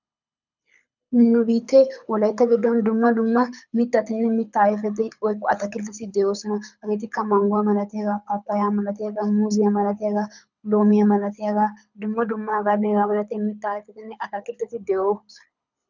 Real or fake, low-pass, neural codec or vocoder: fake; 7.2 kHz; codec, 24 kHz, 6 kbps, HILCodec